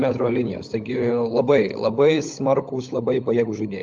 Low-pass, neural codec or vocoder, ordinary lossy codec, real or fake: 7.2 kHz; codec, 16 kHz, 16 kbps, FunCodec, trained on LibriTTS, 50 frames a second; Opus, 24 kbps; fake